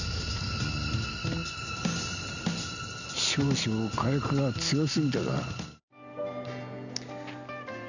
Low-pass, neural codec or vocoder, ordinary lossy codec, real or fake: 7.2 kHz; none; none; real